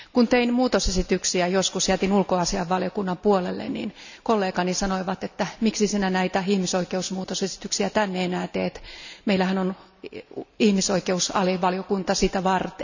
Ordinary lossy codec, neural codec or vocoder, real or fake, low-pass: none; none; real; 7.2 kHz